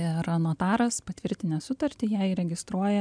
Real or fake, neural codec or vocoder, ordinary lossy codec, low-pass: real; none; MP3, 96 kbps; 9.9 kHz